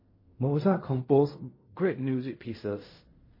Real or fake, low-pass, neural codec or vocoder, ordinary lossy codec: fake; 5.4 kHz; codec, 16 kHz in and 24 kHz out, 0.4 kbps, LongCat-Audio-Codec, fine tuned four codebook decoder; MP3, 24 kbps